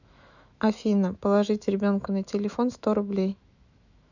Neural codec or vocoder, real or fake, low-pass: autoencoder, 48 kHz, 128 numbers a frame, DAC-VAE, trained on Japanese speech; fake; 7.2 kHz